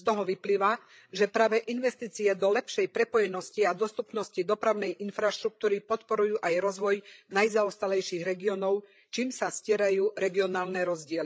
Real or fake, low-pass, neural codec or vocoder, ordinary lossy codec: fake; none; codec, 16 kHz, 8 kbps, FreqCodec, larger model; none